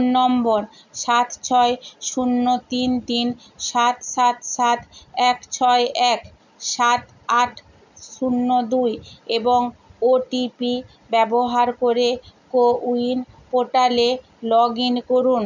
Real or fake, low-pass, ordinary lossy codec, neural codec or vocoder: real; 7.2 kHz; none; none